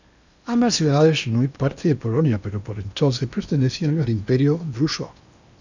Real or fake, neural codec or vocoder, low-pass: fake; codec, 16 kHz in and 24 kHz out, 0.8 kbps, FocalCodec, streaming, 65536 codes; 7.2 kHz